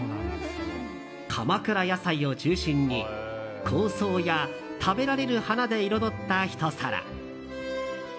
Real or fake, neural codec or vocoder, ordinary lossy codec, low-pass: real; none; none; none